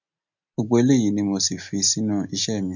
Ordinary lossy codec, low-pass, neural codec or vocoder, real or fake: none; 7.2 kHz; none; real